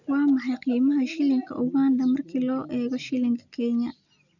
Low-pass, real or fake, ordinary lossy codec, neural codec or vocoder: 7.2 kHz; real; none; none